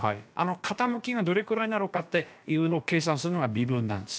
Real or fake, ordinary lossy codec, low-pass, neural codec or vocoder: fake; none; none; codec, 16 kHz, about 1 kbps, DyCAST, with the encoder's durations